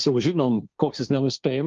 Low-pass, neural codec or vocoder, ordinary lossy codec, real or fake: 7.2 kHz; codec, 16 kHz, 1.1 kbps, Voila-Tokenizer; Opus, 24 kbps; fake